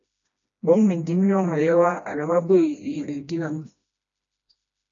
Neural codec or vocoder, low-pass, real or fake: codec, 16 kHz, 1 kbps, FreqCodec, smaller model; 7.2 kHz; fake